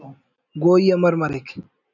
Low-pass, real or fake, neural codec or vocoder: 7.2 kHz; real; none